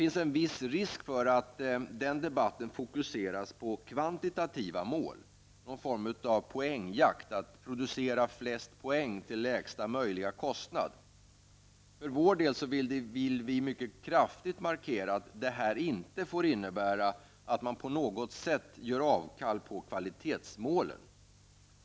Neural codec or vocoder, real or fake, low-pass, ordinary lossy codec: none; real; none; none